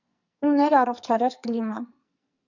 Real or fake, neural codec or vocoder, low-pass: fake; codec, 44.1 kHz, 2.6 kbps, SNAC; 7.2 kHz